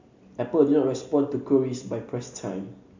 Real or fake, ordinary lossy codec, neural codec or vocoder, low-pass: real; MP3, 48 kbps; none; 7.2 kHz